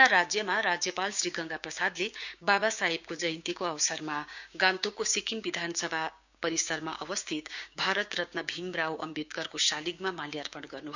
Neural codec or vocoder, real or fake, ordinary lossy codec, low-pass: codec, 16 kHz, 6 kbps, DAC; fake; none; 7.2 kHz